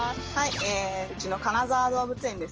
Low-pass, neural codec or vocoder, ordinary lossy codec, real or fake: 7.2 kHz; none; Opus, 24 kbps; real